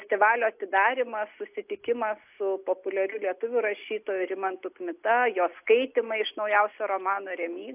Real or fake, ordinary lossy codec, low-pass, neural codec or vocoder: real; AAC, 32 kbps; 3.6 kHz; none